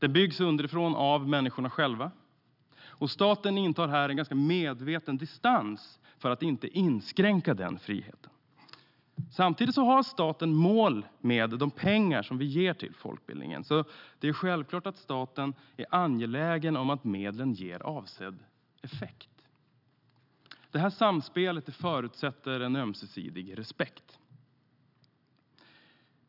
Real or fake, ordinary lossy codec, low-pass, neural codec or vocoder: real; none; 5.4 kHz; none